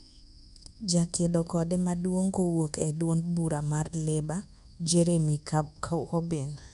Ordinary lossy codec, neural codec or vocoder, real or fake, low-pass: none; codec, 24 kHz, 1.2 kbps, DualCodec; fake; 10.8 kHz